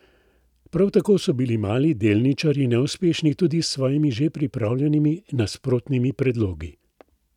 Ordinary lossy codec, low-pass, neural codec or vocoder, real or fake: none; 19.8 kHz; none; real